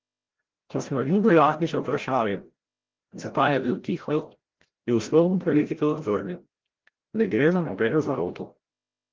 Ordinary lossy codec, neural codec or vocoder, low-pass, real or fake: Opus, 16 kbps; codec, 16 kHz, 0.5 kbps, FreqCodec, larger model; 7.2 kHz; fake